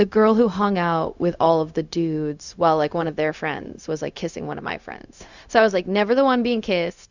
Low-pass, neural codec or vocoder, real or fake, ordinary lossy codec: 7.2 kHz; codec, 16 kHz, 0.4 kbps, LongCat-Audio-Codec; fake; Opus, 64 kbps